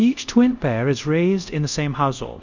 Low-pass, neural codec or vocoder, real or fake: 7.2 kHz; codec, 24 kHz, 0.5 kbps, DualCodec; fake